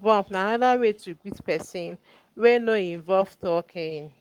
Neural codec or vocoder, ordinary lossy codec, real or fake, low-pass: codec, 44.1 kHz, 7.8 kbps, Pupu-Codec; Opus, 32 kbps; fake; 19.8 kHz